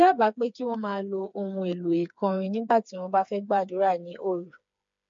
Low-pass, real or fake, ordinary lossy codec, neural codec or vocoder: 7.2 kHz; fake; MP3, 48 kbps; codec, 16 kHz, 4 kbps, FreqCodec, smaller model